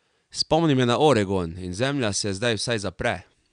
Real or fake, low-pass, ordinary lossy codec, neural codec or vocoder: real; 9.9 kHz; none; none